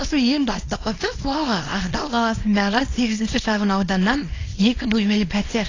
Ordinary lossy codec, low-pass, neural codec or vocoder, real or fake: AAC, 32 kbps; 7.2 kHz; codec, 24 kHz, 0.9 kbps, WavTokenizer, small release; fake